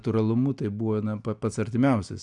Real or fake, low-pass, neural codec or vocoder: real; 10.8 kHz; none